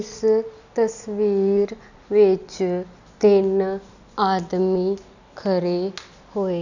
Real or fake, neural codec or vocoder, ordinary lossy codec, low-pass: real; none; none; 7.2 kHz